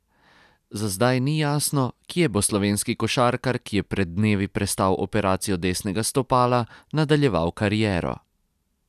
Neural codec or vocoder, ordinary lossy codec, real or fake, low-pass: none; none; real; 14.4 kHz